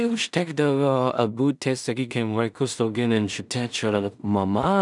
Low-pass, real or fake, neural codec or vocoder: 10.8 kHz; fake; codec, 16 kHz in and 24 kHz out, 0.4 kbps, LongCat-Audio-Codec, two codebook decoder